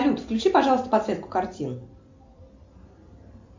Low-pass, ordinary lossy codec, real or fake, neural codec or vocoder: 7.2 kHz; MP3, 48 kbps; real; none